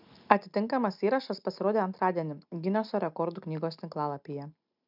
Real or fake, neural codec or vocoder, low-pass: real; none; 5.4 kHz